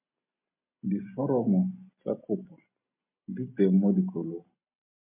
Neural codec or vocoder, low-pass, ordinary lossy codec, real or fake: none; 3.6 kHz; MP3, 32 kbps; real